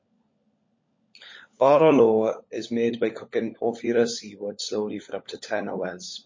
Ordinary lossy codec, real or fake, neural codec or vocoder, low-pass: MP3, 32 kbps; fake; codec, 16 kHz, 16 kbps, FunCodec, trained on LibriTTS, 50 frames a second; 7.2 kHz